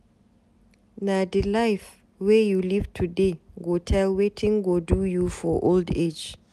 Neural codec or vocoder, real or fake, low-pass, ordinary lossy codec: none; real; 14.4 kHz; none